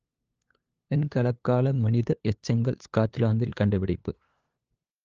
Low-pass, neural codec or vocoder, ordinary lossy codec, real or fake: 7.2 kHz; codec, 16 kHz, 2 kbps, FunCodec, trained on LibriTTS, 25 frames a second; Opus, 24 kbps; fake